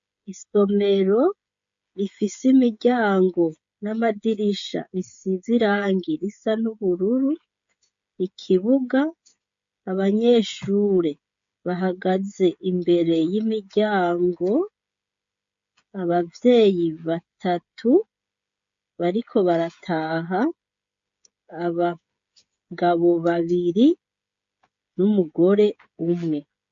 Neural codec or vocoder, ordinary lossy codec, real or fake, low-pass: codec, 16 kHz, 16 kbps, FreqCodec, smaller model; MP3, 48 kbps; fake; 7.2 kHz